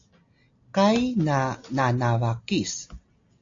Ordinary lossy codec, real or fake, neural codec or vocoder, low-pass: AAC, 48 kbps; real; none; 7.2 kHz